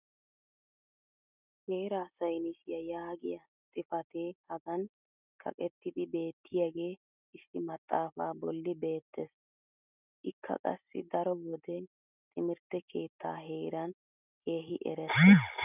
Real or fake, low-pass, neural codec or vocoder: real; 3.6 kHz; none